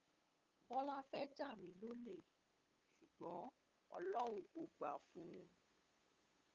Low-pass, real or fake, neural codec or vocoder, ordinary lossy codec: 7.2 kHz; fake; codec, 16 kHz, 8 kbps, FunCodec, trained on LibriTTS, 25 frames a second; Opus, 32 kbps